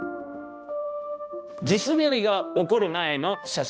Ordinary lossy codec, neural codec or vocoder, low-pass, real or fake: none; codec, 16 kHz, 1 kbps, X-Codec, HuBERT features, trained on balanced general audio; none; fake